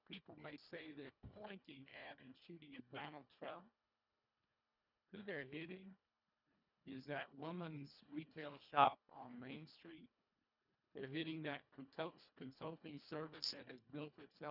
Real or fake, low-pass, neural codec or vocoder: fake; 5.4 kHz; codec, 24 kHz, 1.5 kbps, HILCodec